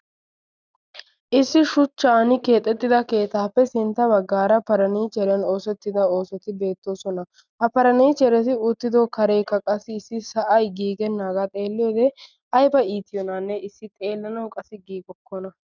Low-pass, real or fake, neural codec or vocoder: 7.2 kHz; real; none